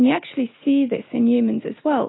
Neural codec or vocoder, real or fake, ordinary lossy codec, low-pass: none; real; AAC, 16 kbps; 7.2 kHz